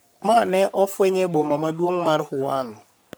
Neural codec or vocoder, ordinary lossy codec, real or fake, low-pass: codec, 44.1 kHz, 3.4 kbps, Pupu-Codec; none; fake; none